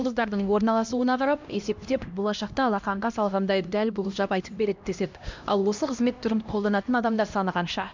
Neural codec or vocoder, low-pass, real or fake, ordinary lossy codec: codec, 16 kHz, 1 kbps, X-Codec, HuBERT features, trained on LibriSpeech; 7.2 kHz; fake; MP3, 64 kbps